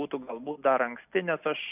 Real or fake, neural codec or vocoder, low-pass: real; none; 3.6 kHz